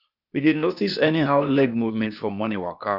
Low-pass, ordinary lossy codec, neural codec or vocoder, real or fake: 5.4 kHz; none; codec, 16 kHz, 0.8 kbps, ZipCodec; fake